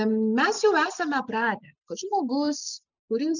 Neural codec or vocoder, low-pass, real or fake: none; 7.2 kHz; real